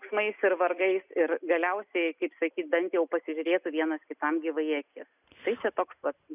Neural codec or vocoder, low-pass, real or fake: none; 3.6 kHz; real